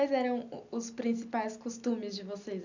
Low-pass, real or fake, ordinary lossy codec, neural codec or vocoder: 7.2 kHz; real; none; none